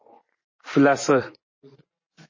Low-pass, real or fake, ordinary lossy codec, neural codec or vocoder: 7.2 kHz; fake; MP3, 32 kbps; vocoder, 24 kHz, 100 mel bands, Vocos